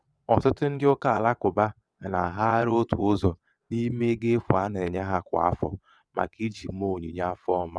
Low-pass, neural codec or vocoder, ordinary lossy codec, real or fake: none; vocoder, 22.05 kHz, 80 mel bands, WaveNeXt; none; fake